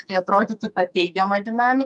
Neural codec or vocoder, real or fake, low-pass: codec, 44.1 kHz, 2.6 kbps, SNAC; fake; 10.8 kHz